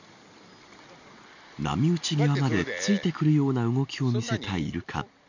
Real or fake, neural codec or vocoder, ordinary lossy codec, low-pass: real; none; none; 7.2 kHz